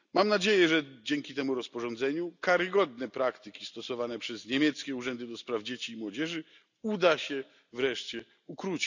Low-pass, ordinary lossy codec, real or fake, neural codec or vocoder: 7.2 kHz; none; real; none